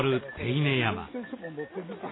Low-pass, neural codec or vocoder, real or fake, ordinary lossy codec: 7.2 kHz; none; real; AAC, 16 kbps